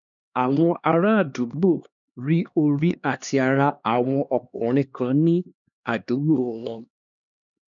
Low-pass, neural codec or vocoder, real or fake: 7.2 kHz; codec, 16 kHz, 2 kbps, X-Codec, HuBERT features, trained on LibriSpeech; fake